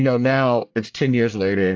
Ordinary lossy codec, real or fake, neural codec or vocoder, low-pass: AAC, 48 kbps; fake; codec, 24 kHz, 1 kbps, SNAC; 7.2 kHz